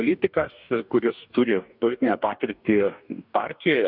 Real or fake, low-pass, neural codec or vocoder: fake; 5.4 kHz; codec, 44.1 kHz, 2.6 kbps, DAC